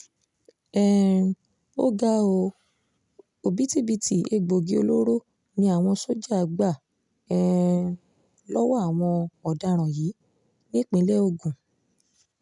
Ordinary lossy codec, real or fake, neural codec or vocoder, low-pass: none; real; none; 10.8 kHz